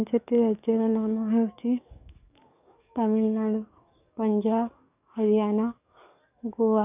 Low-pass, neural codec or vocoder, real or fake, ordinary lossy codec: 3.6 kHz; codec, 44.1 kHz, 7.8 kbps, DAC; fake; none